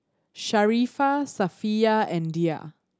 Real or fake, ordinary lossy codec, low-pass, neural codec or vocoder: real; none; none; none